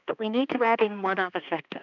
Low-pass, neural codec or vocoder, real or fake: 7.2 kHz; codec, 16 kHz, 2 kbps, X-Codec, HuBERT features, trained on balanced general audio; fake